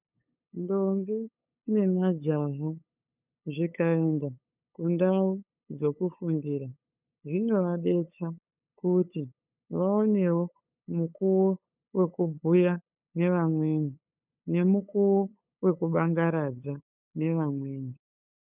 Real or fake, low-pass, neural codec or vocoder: fake; 3.6 kHz; codec, 16 kHz, 8 kbps, FunCodec, trained on LibriTTS, 25 frames a second